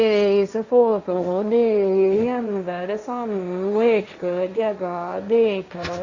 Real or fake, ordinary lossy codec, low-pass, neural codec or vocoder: fake; Opus, 64 kbps; 7.2 kHz; codec, 16 kHz, 1.1 kbps, Voila-Tokenizer